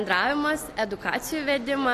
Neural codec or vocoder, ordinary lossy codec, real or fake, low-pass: none; AAC, 48 kbps; real; 14.4 kHz